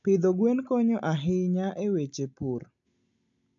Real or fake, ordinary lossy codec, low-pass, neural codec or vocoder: real; none; 7.2 kHz; none